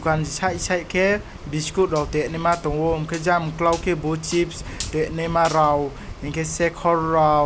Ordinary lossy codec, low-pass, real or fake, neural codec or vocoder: none; none; real; none